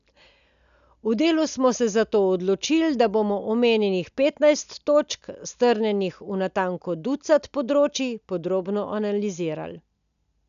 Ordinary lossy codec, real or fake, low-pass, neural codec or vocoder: none; real; 7.2 kHz; none